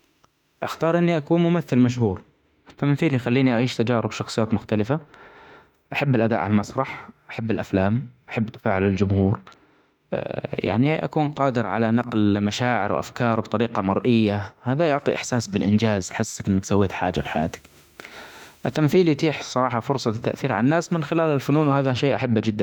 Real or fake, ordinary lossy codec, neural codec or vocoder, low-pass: fake; none; autoencoder, 48 kHz, 32 numbers a frame, DAC-VAE, trained on Japanese speech; 19.8 kHz